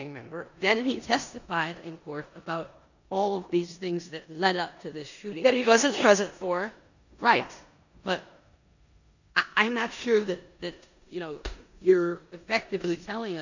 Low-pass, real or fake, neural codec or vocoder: 7.2 kHz; fake; codec, 16 kHz in and 24 kHz out, 0.9 kbps, LongCat-Audio-Codec, four codebook decoder